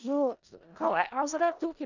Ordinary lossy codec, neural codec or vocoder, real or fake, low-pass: none; codec, 16 kHz in and 24 kHz out, 0.4 kbps, LongCat-Audio-Codec, four codebook decoder; fake; 7.2 kHz